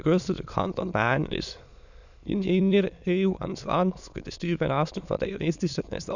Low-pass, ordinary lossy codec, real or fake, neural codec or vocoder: 7.2 kHz; none; fake; autoencoder, 22.05 kHz, a latent of 192 numbers a frame, VITS, trained on many speakers